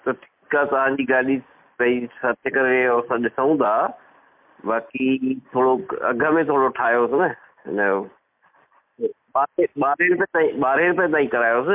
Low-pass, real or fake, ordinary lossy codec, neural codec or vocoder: 3.6 kHz; real; MP3, 32 kbps; none